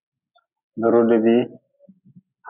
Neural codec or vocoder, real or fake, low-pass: none; real; 3.6 kHz